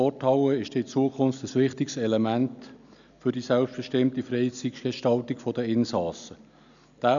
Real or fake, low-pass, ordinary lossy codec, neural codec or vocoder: real; 7.2 kHz; none; none